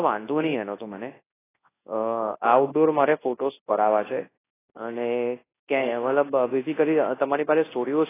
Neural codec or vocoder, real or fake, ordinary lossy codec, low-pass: codec, 24 kHz, 0.9 kbps, WavTokenizer, large speech release; fake; AAC, 16 kbps; 3.6 kHz